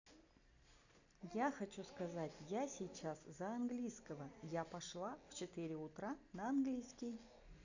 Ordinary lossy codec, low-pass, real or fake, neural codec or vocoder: none; 7.2 kHz; real; none